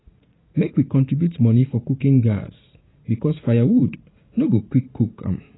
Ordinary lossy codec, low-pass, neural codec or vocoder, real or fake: AAC, 16 kbps; 7.2 kHz; vocoder, 44.1 kHz, 80 mel bands, Vocos; fake